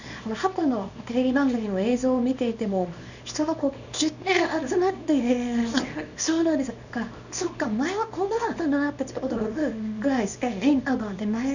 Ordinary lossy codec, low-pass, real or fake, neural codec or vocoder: none; 7.2 kHz; fake; codec, 24 kHz, 0.9 kbps, WavTokenizer, small release